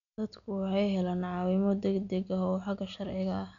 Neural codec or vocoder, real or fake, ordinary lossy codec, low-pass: none; real; none; 7.2 kHz